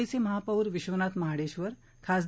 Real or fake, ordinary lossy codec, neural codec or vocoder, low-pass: real; none; none; none